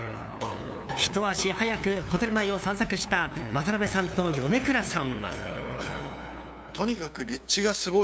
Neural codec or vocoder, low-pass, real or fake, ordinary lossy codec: codec, 16 kHz, 2 kbps, FunCodec, trained on LibriTTS, 25 frames a second; none; fake; none